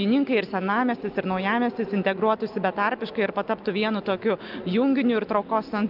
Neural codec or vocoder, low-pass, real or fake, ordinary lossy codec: none; 5.4 kHz; real; Opus, 24 kbps